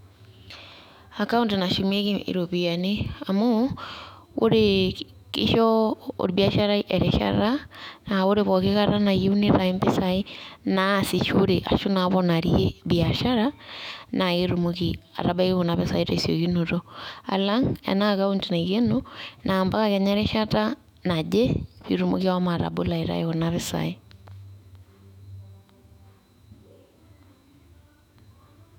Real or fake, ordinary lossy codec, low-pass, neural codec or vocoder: fake; none; 19.8 kHz; autoencoder, 48 kHz, 128 numbers a frame, DAC-VAE, trained on Japanese speech